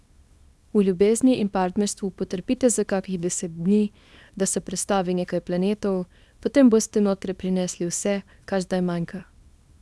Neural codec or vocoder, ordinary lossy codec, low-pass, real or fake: codec, 24 kHz, 0.9 kbps, WavTokenizer, small release; none; none; fake